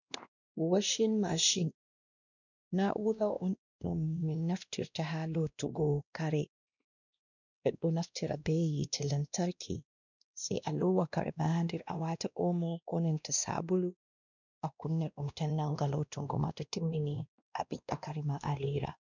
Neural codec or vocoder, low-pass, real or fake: codec, 16 kHz, 1 kbps, X-Codec, WavLM features, trained on Multilingual LibriSpeech; 7.2 kHz; fake